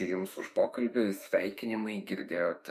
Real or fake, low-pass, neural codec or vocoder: fake; 14.4 kHz; autoencoder, 48 kHz, 32 numbers a frame, DAC-VAE, trained on Japanese speech